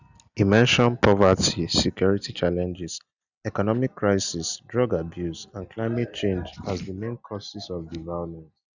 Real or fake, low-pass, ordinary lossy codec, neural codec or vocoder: real; 7.2 kHz; none; none